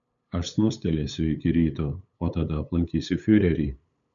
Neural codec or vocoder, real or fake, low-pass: codec, 16 kHz, 8 kbps, FunCodec, trained on LibriTTS, 25 frames a second; fake; 7.2 kHz